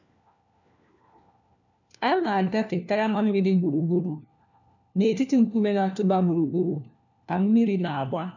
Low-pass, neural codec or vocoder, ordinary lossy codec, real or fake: 7.2 kHz; codec, 16 kHz, 1 kbps, FunCodec, trained on LibriTTS, 50 frames a second; none; fake